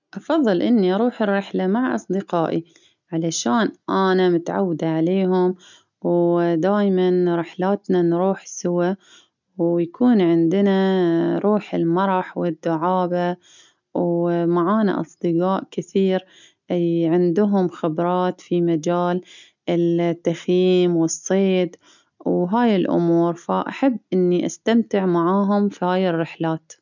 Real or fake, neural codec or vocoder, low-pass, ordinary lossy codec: real; none; 7.2 kHz; none